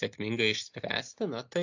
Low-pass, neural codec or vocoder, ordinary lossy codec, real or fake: 7.2 kHz; none; AAC, 48 kbps; real